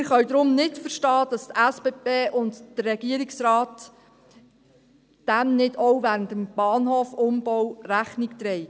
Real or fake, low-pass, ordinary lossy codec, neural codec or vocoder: real; none; none; none